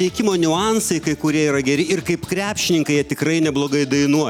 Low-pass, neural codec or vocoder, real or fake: 19.8 kHz; none; real